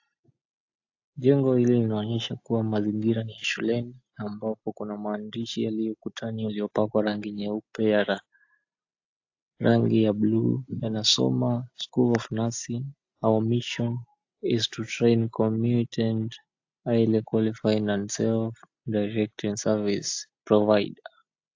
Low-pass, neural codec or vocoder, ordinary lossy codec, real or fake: 7.2 kHz; none; AAC, 48 kbps; real